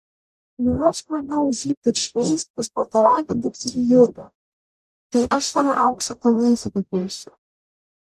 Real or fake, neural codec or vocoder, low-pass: fake; codec, 44.1 kHz, 0.9 kbps, DAC; 14.4 kHz